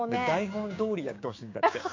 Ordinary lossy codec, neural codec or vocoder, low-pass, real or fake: MP3, 48 kbps; codec, 16 kHz, 6 kbps, DAC; 7.2 kHz; fake